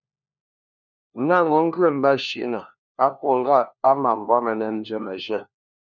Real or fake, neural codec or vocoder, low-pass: fake; codec, 16 kHz, 1 kbps, FunCodec, trained on LibriTTS, 50 frames a second; 7.2 kHz